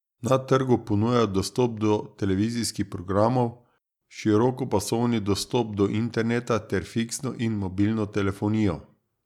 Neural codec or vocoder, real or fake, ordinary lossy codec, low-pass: none; real; none; 19.8 kHz